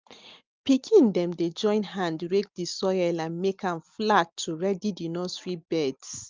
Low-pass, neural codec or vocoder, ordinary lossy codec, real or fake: 7.2 kHz; none; Opus, 32 kbps; real